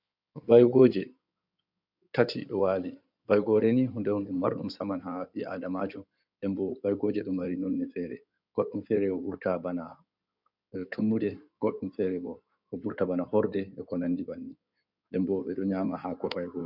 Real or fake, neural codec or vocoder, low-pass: fake; codec, 16 kHz in and 24 kHz out, 2.2 kbps, FireRedTTS-2 codec; 5.4 kHz